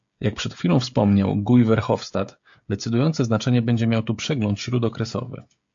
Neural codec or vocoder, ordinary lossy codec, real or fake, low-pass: codec, 16 kHz, 16 kbps, FreqCodec, smaller model; AAC, 64 kbps; fake; 7.2 kHz